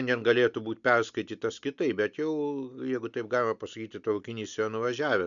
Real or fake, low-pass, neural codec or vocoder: real; 7.2 kHz; none